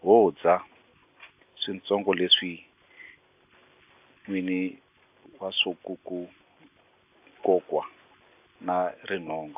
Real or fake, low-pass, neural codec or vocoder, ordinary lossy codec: real; 3.6 kHz; none; AAC, 32 kbps